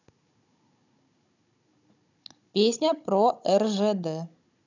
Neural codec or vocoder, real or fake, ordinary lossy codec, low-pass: codec, 16 kHz, 16 kbps, FunCodec, trained on Chinese and English, 50 frames a second; fake; none; 7.2 kHz